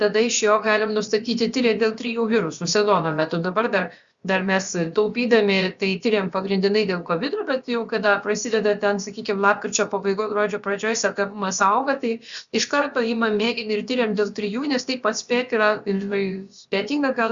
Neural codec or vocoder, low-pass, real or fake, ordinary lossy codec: codec, 16 kHz, about 1 kbps, DyCAST, with the encoder's durations; 7.2 kHz; fake; Opus, 64 kbps